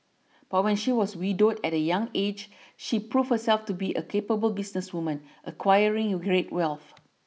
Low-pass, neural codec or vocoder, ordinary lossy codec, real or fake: none; none; none; real